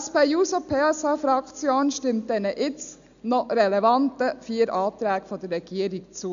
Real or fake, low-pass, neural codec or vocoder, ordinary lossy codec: real; 7.2 kHz; none; none